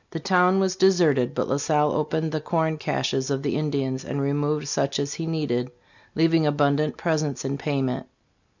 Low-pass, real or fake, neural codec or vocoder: 7.2 kHz; real; none